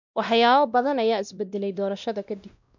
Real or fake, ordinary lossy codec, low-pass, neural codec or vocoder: fake; none; 7.2 kHz; codec, 16 kHz, 1 kbps, X-Codec, WavLM features, trained on Multilingual LibriSpeech